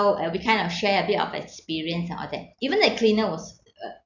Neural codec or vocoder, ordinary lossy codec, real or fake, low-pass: none; none; real; 7.2 kHz